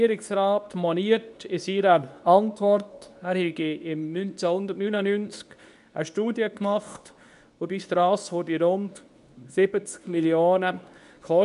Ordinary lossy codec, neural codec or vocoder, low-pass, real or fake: none; codec, 24 kHz, 0.9 kbps, WavTokenizer, medium speech release version 2; 10.8 kHz; fake